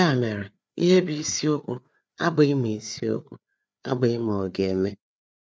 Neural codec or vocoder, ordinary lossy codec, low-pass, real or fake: codec, 16 kHz, 4 kbps, FreqCodec, larger model; none; none; fake